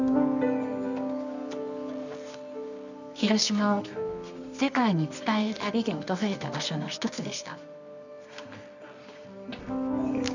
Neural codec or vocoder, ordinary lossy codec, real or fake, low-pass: codec, 24 kHz, 0.9 kbps, WavTokenizer, medium music audio release; none; fake; 7.2 kHz